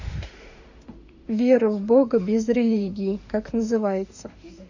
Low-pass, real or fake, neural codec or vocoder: 7.2 kHz; fake; autoencoder, 48 kHz, 32 numbers a frame, DAC-VAE, trained on Japanese speech